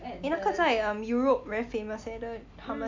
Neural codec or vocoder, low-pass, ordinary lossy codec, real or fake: none; 7.2 kHz; MP3, 48 kbps; real